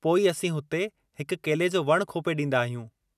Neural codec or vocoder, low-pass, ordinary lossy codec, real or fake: none; 14.4 kHz; none; real